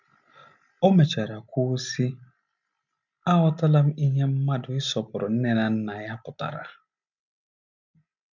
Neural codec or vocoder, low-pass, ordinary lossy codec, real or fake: none; 7.2 kHz; none; real